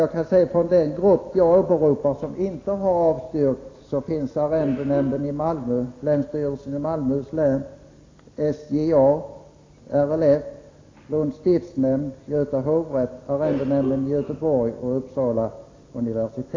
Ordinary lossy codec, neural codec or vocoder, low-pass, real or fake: AAC, 32 kbps; none; 7.2 kHz; real